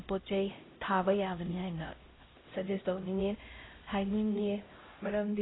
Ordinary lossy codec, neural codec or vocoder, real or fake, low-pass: AAC, 16 kbps; codec, 16 kHz, 0.5 kbps, X-Codec, HuBERT features, trained on LibriSpeech; fake; 7.2 kHz